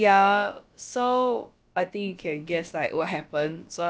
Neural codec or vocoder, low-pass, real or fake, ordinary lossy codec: codec, 16 kHz, about 1 kbps, DyCAST, with the encoder's durations; none; fake; none